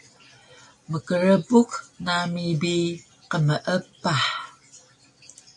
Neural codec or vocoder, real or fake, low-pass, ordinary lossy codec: none; real; 10.8 kHz; AAC, 64 kbps